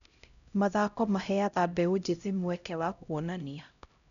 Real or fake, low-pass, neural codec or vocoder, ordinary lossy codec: fake; 7.2 kHz; codec, 16 kHz, 0.5 kbps, X-Codec, HuBERT features, trained on LibriSpeech; none